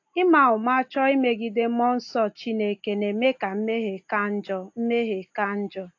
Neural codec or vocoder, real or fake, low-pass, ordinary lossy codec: none; real; 7.2 kHz; AAC, 48 kbps